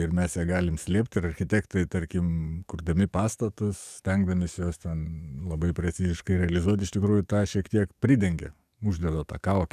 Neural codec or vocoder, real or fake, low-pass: codec, 44.1 kHz, 7.8 kbps, DAC; fake; 14.4 kHz